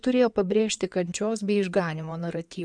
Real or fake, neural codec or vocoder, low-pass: fake; codec, 16 kHz in and 24 kHz out, 2.2 kbps, FireRedTTS-2 codec; 9.9 kHz